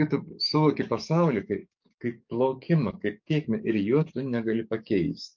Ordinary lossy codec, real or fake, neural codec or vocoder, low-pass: MP3, 48 kbps; fake; codec, 44.1 kHz, 7.8 kbps, DAC; 7.2 kHz